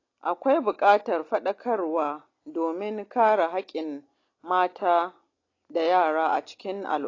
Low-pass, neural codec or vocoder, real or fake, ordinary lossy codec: 7.2 kHz; none; real; AAC, 32 kbps